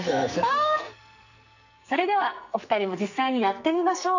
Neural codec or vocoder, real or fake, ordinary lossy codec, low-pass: codec, 44.1 kHz, 2.6 kbps, SNAC; fake; none; 7.2 kHz